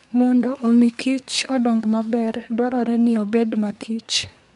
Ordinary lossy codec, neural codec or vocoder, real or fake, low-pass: none; codec, 24 kHz, 1 kbps, SNAC; fake; 10.8 kHz